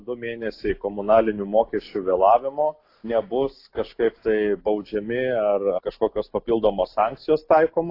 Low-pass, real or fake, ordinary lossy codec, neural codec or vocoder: 5.4 kHz; real; AAC, 32 kbps; none